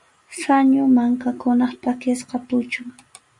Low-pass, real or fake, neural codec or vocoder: 10.8 kHz; real; none